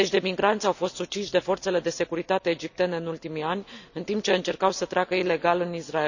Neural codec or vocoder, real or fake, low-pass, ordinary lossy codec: none; real; 7.2 kHz; none